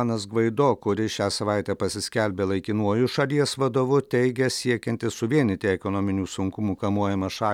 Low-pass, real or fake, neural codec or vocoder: 19.8 kHz; real; none